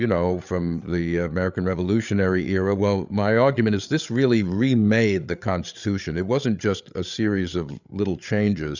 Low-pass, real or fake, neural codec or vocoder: 7.2 kHz; fake; codec, 16 kHz, 8 kbps, FreqCodec, larger model